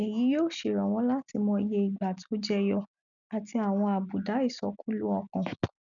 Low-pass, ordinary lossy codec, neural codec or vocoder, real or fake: 7.2 kHz; none; none; real